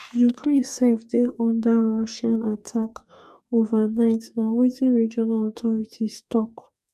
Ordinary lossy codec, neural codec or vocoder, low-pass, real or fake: none; codec, 44.1 kHz, 2.6 kbps, DAC; 14.4 kHz; fake